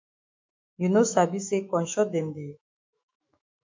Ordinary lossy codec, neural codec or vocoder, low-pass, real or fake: MP3, 48 kbps; autoencoder, 48 kHz, 128 numbers a frame, DAC-VAE, trained on Japanese speech; 7.2 kHz; fake